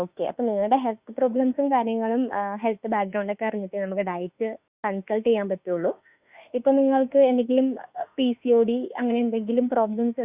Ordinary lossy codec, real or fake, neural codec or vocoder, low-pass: Opus, 64 kbps; fake; autoencoder, 48 kHz, 32 numbers a frame, DAC-VAE, trained on Japanese speech; 3.6 kHz